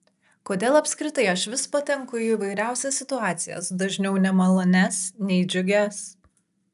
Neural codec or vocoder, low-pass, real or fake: none; 14.4 kHz; real